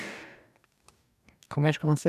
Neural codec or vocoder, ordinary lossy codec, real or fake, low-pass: codec, 44.1 kHz, 2.6 kbps, DAC; none; fake; 14.4 kHz